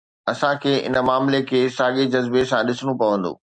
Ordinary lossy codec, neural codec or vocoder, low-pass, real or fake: MP3, 96 kbps; none; 9.9 kHz; real